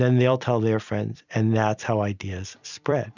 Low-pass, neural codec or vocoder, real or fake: 7.2 kHz; vocoder, 44.1 kHz, 128 mel bands every 256 samples, BigVGAN v2; fake